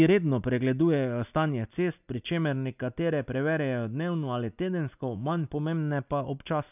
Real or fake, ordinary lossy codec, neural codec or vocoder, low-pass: real; none; none; 3.6 kHz